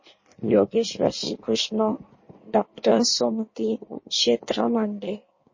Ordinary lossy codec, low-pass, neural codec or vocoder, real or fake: MP3, 32 kbps; 7.2 kHz; codec, 16 kHz in and 24 kHz out, 1.1 kbps, FireRedTTS-2 codec; fake